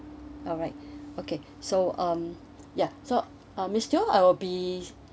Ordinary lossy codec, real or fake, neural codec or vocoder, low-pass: none; real; none; none